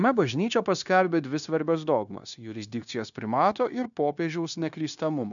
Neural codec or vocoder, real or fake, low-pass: codec, 16 kHz, 0.9 kbps, LongCat-Audio-Codec; fake; 7.2 kHz